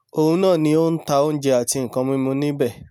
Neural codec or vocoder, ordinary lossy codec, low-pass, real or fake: none; none; 19.8 kHz; real